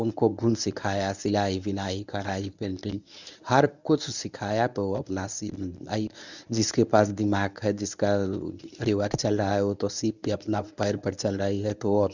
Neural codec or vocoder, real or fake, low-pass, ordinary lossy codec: codec, 24 kHz, 0.9 kbps, WavTokenizer, medium speech release version 1; fake; 7.2 kHz; none